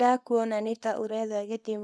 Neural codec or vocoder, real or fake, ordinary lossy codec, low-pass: codec, 24 kHz, 0.9 kbps, WavTokenizer, small release; fake; none; none